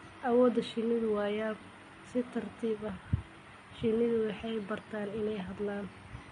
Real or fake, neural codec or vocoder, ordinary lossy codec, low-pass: real; none; MP3, 48 kbps; 19.8 kHz